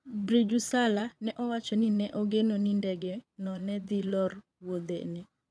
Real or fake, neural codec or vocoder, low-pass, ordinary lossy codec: fake; vocoder, 22.05 kHz, 80 mel bands, Vocos; none; none